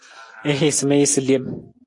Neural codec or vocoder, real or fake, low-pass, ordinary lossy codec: none; real; 10.8 kHz; AAC, 48 kbps